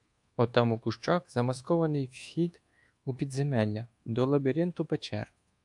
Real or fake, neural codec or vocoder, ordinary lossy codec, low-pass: fake; codec, 24 kHz, 1.2 kbps, DualCodec; AAC, 64 kbps; 10.8 kHz